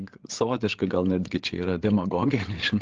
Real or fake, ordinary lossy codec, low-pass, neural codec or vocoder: fake; Opus, 16 kbps; 7.2 kHz; codec, 16 kHz, 8 kbps, FreqCodec, larger model